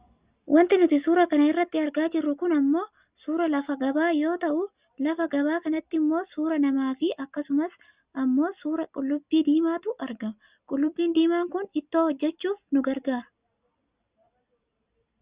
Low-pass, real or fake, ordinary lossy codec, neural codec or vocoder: 3.6 kHz; real; Opus, 64 kbps; none